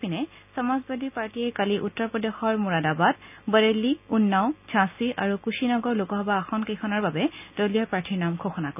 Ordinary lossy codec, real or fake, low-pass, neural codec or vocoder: none; real; 3.6 kHz; none